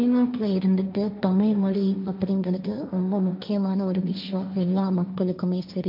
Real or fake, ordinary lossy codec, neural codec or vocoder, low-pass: fake; MP3, 48 kbps; codec, 16 kHz, 1.1 kbps, Voila-Tokenizer; 5.4 kHz